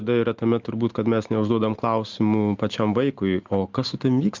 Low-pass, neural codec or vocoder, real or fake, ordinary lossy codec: 7.2 kHz; none; real; Opus, 32 kbps